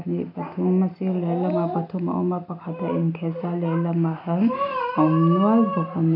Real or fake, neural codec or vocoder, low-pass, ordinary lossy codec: real; none; 5.4 kHz; none